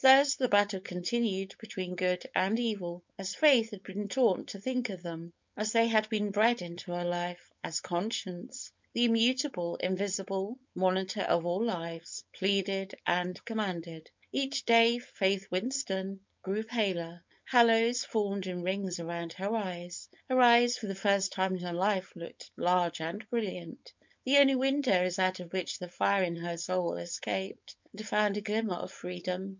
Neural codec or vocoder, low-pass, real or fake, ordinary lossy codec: codec, 16 kHz, 4.8 kbps, FACodec; 7.2 kHz; fake; MP3, 64 kbps